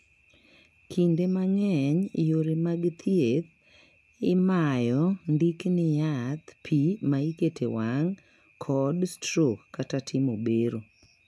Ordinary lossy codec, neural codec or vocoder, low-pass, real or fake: none; none; none; real